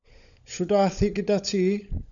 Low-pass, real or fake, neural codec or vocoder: 7.2 kHz; fake; codec, 16 kHz, 16 kbps, FunCodec, trained on LibriTTS, 50 frames a second